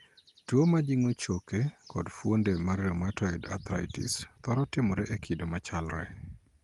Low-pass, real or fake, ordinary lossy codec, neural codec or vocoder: 10.8 kHz; real; Opus, 24 kbps; none